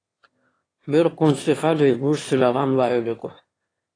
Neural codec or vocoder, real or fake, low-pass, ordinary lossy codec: autoencoder, 22.05 kHz, a latent of 192 numbers a frame, VITS, trained on one speaker; fake; 9.9 kHz; AAC, 32 kbps